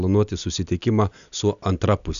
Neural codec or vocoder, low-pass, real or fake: none; 7.2 kHz; real